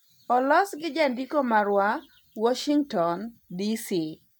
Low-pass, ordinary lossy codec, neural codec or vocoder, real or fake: none; none; none; real